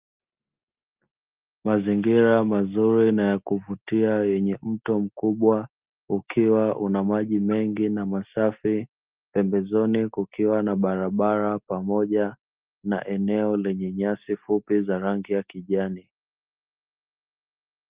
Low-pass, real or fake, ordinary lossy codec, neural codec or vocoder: 3.6 kHz; real; Opus, 32 kbps; none